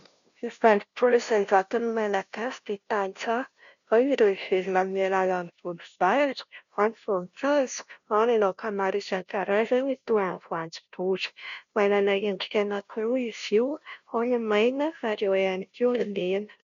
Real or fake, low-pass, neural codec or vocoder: fake; 7.2 kHz; codec, 16 kHz, 0.5 kbps, FunCodec, trained on Chinese and English, 25 frames a second